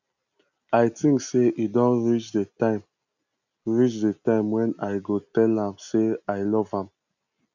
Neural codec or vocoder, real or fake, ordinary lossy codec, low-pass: none; real; none; 7.2 kHz